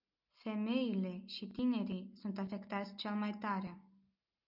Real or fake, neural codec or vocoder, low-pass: real; none; 5.4 kHz